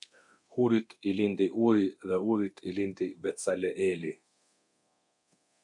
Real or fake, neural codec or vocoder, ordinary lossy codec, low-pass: fake; codec, 24 kHz, 0.9 kbps, DualCodec; MP3, 64 kbps; 10.8 kHz